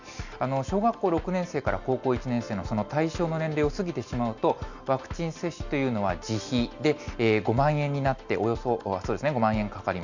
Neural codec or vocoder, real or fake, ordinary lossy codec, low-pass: none; real; none; 7.2 kHz